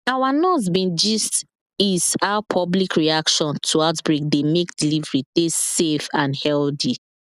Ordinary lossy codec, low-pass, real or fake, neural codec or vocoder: none; 14.4 kHz; real; none